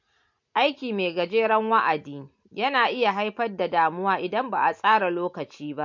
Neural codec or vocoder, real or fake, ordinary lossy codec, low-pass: none; real; MP3, 48 kbps; 7.2 kHz